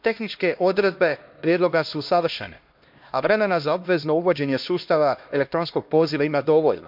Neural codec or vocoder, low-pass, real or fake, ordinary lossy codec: codec, 16 kHz, 1 kbps, X-Codec, HuBERT features, trained on LibriSpeech; 5.4 kHz; fake; MP3, 48 kbps